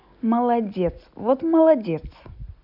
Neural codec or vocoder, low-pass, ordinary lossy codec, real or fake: none; 5.4 kHz; none; real